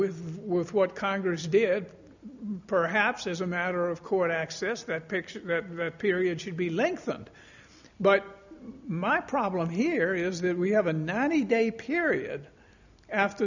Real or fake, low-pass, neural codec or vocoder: real; 7.2 kHz; none